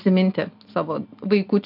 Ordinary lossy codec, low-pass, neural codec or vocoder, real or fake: MP3, 48 kbps; 5.4 kHz; none; real